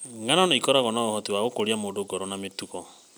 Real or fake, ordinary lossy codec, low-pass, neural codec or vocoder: real; none; none; none